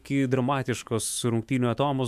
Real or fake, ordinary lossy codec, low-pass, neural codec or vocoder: fake; MP3, 96 kbps; 14.4 kHz; autoencoder, 48 kHz, 128 numbers a frame, DAC-VAE, trained on Japanese speech